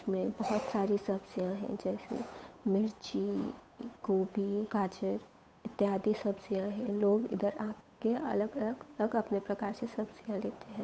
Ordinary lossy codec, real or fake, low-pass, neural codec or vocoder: none; fake; none; codec, 16 kHz, 8 kbps, FunCodec, trained on Chinese and English, 25 frames a second